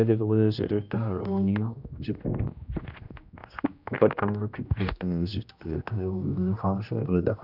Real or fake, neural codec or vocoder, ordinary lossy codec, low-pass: fake; codec, 16 kHz, 1 kbps, X-Codec, HuBERT features, trained on general audio; none; 5.4 kHz